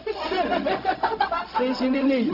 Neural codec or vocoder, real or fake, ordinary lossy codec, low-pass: codec, 16 kHz, 0.4 kbps, LongCat-Audio-Codec; fake; none; 5.4 kHz